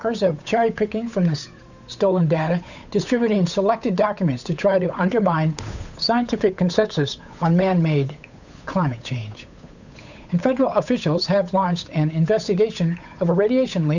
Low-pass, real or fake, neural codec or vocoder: 7.2 kHz; fake; codec, 16 kHz, 8 kbps, FunCodec, trained on Chinese and English, 25 frames a second